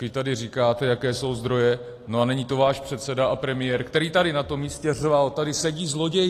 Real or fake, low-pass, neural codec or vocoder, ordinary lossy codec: real; 14.4 kHz; none; AAC, 48 kbps